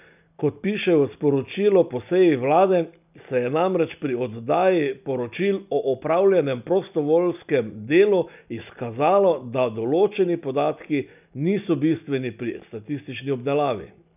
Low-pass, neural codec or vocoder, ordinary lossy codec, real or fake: 3.6 kHz; none; none; real